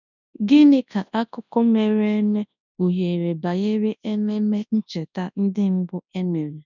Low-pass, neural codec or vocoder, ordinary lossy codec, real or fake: 7.2 kHz; codec, 24 kHz, 0.9 kbps, WavTokenizer, large speech release; none; fake